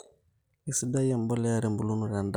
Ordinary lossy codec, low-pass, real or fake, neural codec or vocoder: none; none; real; none